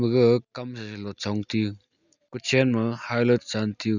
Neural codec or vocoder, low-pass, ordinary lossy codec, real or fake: none; 7.2 kHz; none; real